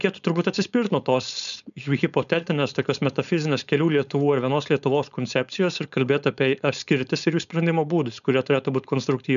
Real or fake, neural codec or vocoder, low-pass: fake; codec, 16 kHz, 4.8 kbps, FACodec; 7.2 kHz